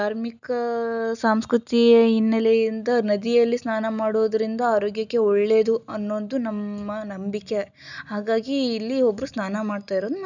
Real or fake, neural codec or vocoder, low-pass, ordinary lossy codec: real; none; 7.2 kHz; none